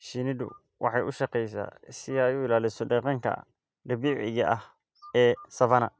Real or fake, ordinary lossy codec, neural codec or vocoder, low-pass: real; none; none; none